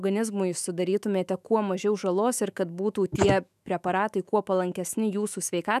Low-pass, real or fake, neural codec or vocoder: 14.4 kHz; fake; autoencoder, 48 kHz, 128 numbers a frame, DAC-VAE, trained on Japanese speech